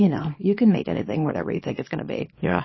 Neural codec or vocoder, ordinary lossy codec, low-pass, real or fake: codec, 24 kHz, 0.9 kbps, WavTokenizer, small release; MP3, 24 kbps; 7.2 kHz; fake